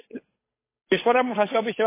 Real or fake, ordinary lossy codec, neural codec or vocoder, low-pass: fake; MP3, 16 kbps; codec, 16 kHz, 8 kbps, FunCodec, trained on Chinese and English, 25 frames a second; 3.6 kHz